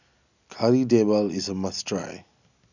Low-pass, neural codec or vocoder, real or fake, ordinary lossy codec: 7.2 kHz; none; real; none